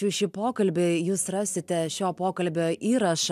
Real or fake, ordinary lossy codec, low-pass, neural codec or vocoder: real; MP3, 96 kbps; 14.4 kHz; none